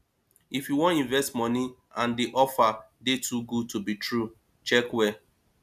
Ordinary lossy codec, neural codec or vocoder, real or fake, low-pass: none; none; real; 14.4 kHz